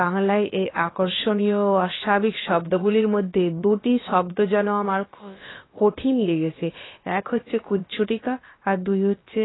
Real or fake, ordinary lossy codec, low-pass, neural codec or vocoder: fake; AAC, 16 kbps; 7.2 kHz; codec, 16 kHz, about 1 kbps, DyCAST, with the encoder's durations